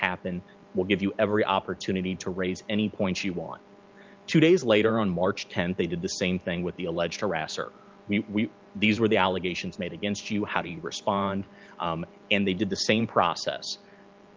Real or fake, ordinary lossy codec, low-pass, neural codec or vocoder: fake; Opus, 24 kbps; 7.2 kHz; vocoder, 44.1 kHz, 128 mel bands every 512 samples, BigVGAN v2